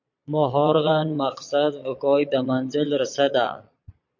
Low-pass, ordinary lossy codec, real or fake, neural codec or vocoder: 7.2 kHz; MP3, 48 kbps; fake; vocoder, 22.05 kHz, 80 mel bands, Vocos